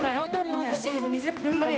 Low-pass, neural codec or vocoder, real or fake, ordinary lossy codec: none; codec, 16 kHz, 1 kbps, X-Codec, HuBERT features, trained on balanced general audio; fake; none